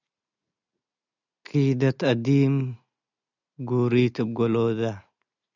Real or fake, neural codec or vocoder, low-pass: real; none; 7.2 kHz